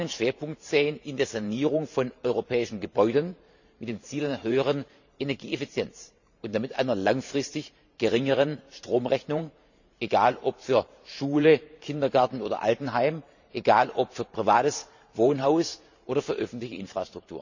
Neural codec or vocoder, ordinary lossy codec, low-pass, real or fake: vocoder, 44.1 kHz, 128 mel bands every 512 samples, BigVGAN v2; none; 7.2 kHz; fake